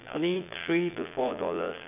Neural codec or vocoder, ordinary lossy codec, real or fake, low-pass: vocoder, 22.05 kHz, 80 mel bands, Vocos; none; fake; 3.6 kHz